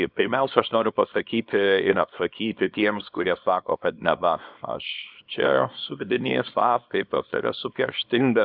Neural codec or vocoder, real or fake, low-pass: codec, 24 kHz, 0.9 kbps, WavTokenizer, small release; fake; 5.4 kHz